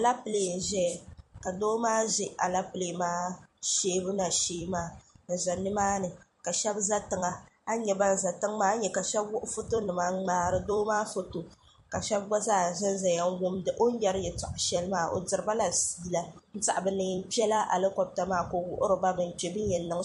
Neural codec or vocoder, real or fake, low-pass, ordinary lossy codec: vocoder, 44.1 kHz, 128 mel bands every 256 samples, BigVGAN v2; fake; 14.4 kHz; MP3, 48 kbps